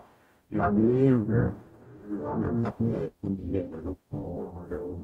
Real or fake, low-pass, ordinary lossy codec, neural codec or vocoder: fake; 19.8 kHz; AAC, 48 kbps; codec, 44.1 kHz, 0.9 kbps, DAC